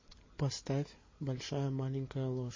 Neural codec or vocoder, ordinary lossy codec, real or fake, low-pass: none; MP3, 32 kbps; real; 7.2 kHz